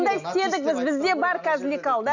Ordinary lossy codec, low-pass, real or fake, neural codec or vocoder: none; 7.2 kHz; real; none